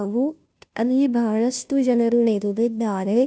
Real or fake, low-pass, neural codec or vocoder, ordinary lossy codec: fake; none; codec, 16 kHz, 0.5 kbps, FunCodec, trained on Chinese and English, 25 frames a second; none